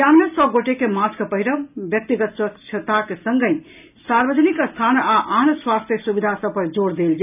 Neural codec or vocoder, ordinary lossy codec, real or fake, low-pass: none; none; real; 3.6 kHz